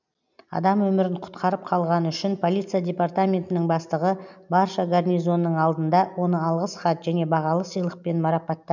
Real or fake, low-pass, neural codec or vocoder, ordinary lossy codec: real; 7.2 kHz; none; none